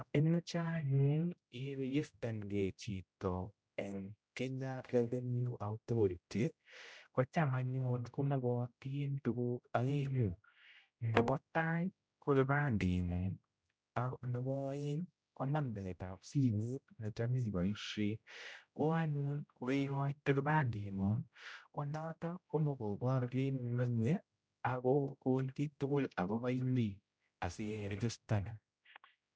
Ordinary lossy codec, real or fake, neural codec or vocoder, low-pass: none; fake; codec, 16 kHz, 0.5 kbps, X-Codec, HuBERT features, trained on general audio; none